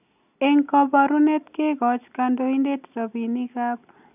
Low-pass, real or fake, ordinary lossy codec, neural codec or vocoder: 3.6 kHz; real; none; none